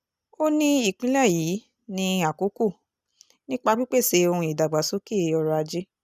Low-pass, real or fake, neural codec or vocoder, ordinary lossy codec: 14.4 kHz; real; none; none